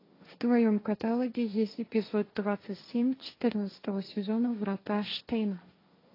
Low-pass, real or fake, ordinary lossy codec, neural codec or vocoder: 5.4 kHz; fake; AAC, 24 kbps; codec, 16 kHz, 1.1 kbps, Voila-Tokenizer